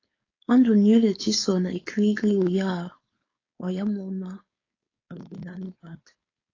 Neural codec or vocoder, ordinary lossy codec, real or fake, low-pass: codec, 16 kHz, 4.8 kbps, FACodec; AAC, 32 kbps; fake; 7.2 kHz